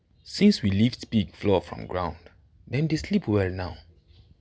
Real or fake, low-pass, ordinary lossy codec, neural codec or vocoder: real; none; none; none